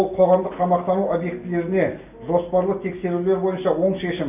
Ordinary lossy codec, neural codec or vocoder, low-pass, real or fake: none; none; 3.6 kHz; real